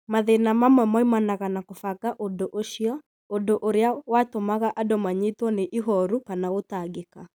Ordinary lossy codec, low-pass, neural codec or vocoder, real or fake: none; none; none; real